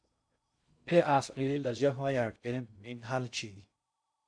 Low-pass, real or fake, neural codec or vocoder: 9.9 kHz; fake; codec, 16 kHz in and 24 kHz out, 0.6 kbps, FocalCodec, streaming, 2048 codes